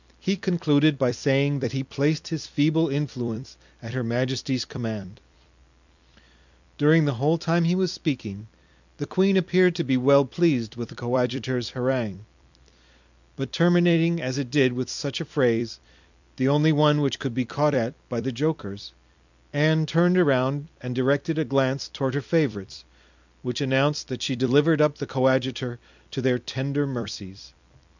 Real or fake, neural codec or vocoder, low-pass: fake; vocoder, 44.1 kHz, 128 mel bands every 256 samples, BigVGAN v2; 7.2 kHz